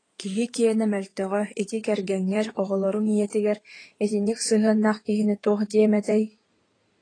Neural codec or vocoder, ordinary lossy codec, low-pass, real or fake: codec, 16 kHz in and 24 kHz out, 2.2 kbps, FireRedTTS-2 codec; AAC, 32 kbps; 9.9 kHz; fake